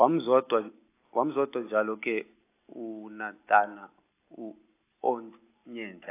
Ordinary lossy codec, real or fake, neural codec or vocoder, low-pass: AAC, 24 kbps; real; none; 3.6 kHz